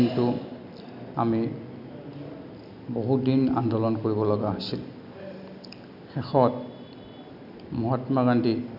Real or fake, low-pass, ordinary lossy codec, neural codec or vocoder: real; 5.4 kHz; none; none